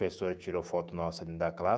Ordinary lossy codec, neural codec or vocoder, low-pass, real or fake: none; codec, 16 kHz, 6 kbps, DAC; none; fake